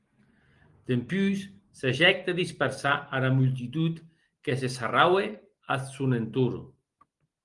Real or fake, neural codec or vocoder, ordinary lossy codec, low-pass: real; none; Opus, 24 kbps; 10.8 kHz